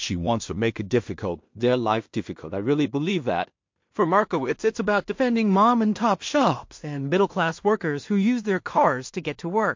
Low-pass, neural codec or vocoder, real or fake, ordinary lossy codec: 7.2 kHz; codec, 16 kHz in and 24 kHz out, 0.4 kbps, LongCat-Audio-Codec, two codebook decoder; fake; MP3, 48 kbps